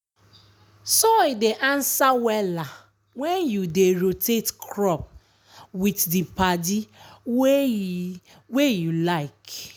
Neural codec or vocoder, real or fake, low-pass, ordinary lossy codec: none; real; none; none